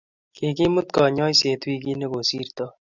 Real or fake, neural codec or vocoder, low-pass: real; none; 7.2 kHz